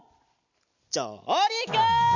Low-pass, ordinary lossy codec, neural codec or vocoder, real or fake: 7.2 kHz; none; none; real